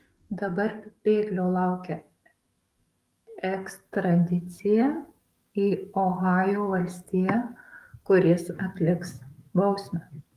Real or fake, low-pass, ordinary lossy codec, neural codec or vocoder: fake; 14.4 kHz; Opus, 32 kbps; codec, 44.1 kHz, 7.8 kbps, DAC